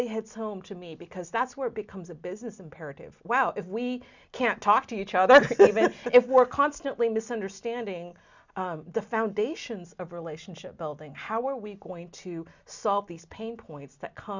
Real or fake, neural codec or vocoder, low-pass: real; none; 7.2 kHz